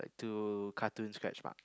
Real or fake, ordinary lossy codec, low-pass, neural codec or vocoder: real; none; none; none